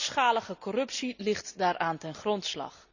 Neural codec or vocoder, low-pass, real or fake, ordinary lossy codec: none; 7.2 kHz; real; none